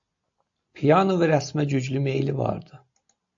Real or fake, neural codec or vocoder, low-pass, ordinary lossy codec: real; none; 7.2 kHz; AAC, 64 kbps